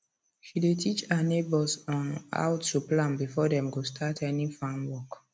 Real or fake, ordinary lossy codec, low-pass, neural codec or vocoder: real; none; none; none